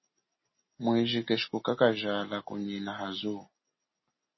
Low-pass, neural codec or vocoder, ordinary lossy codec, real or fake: 7.2 kHz; none; MP3, 24 kbps; real